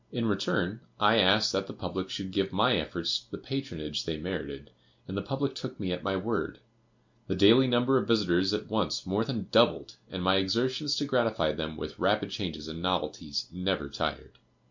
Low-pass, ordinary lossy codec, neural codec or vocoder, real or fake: 7.2 kHz; MP3, 48 kbps; none; real